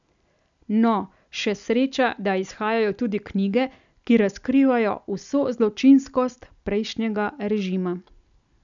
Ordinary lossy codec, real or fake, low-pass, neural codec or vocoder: none; real; 7.2 kHz; none